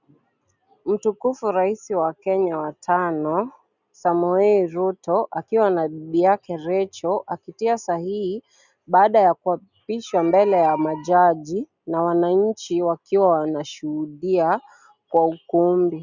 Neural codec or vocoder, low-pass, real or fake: none; 7.2 kHz; real